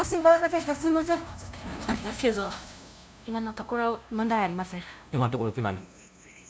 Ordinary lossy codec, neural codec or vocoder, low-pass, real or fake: none; codec, 16 kHz, 0.5 kbps, FunCodec, trained on LibriTTS, 25 frames a second; none; fake